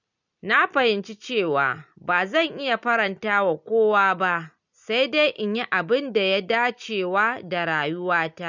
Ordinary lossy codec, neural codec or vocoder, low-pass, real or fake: none; none; 7.2 kHz; real